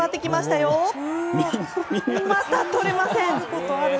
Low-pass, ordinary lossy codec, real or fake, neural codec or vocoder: none; none; real; none